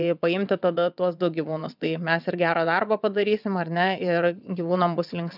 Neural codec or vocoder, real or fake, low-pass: vocoder, 22.05 kHz, 80 mel bands, WaveNeXt; fake; 5.4 kHz